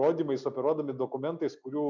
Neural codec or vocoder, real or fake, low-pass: none; real; 7.2 kHz